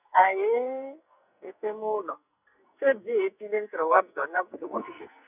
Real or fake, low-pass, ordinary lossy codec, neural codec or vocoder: fake; 3.6 kHz; none; codec, 44.1 kHz, 2.6 kbps, SNAC